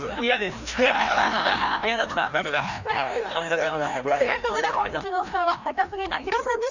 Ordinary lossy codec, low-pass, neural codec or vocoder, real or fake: none; 7.2 kHz; codec, 16 kHz, 1 kbps, FreqCodec, larger model; fake